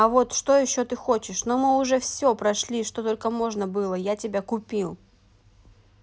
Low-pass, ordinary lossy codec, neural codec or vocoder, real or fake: none; none; none; real